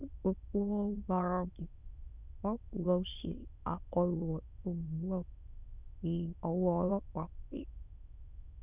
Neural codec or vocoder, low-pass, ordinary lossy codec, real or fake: autoencoder, 22.05 kHz, a latent of 192 numbers a frame, VITS, trained on many speakers; 3.6 kHz; none; fake